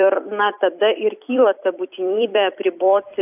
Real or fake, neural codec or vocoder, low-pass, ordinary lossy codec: fake; vocoder, 44.1 kHz, 128 mel bands every 256 samples, BigVGAN v2; 3.6 kHz; AAC, 32 kbps